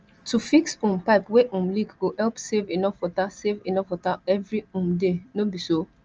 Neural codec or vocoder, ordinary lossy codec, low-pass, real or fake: none; Opus, 24 kbps; 7.2 kHz; real